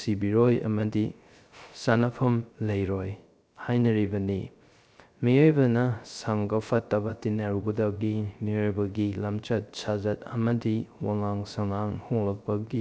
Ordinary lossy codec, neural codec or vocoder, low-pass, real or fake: none; codec, 16 kHz, 0.3 kbps, FocalCodec; none; fake